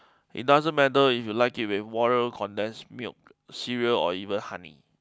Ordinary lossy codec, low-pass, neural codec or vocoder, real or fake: none; none; none; real